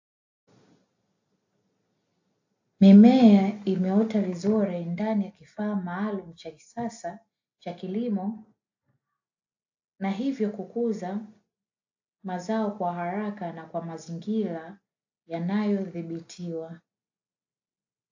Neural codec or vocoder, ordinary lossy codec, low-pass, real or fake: none; AAC, 48 kbps; 7.2 kHz; real